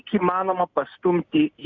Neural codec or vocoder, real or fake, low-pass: none; real; 7.2 kHz